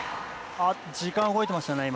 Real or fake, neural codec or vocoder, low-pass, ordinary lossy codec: real; none; none; none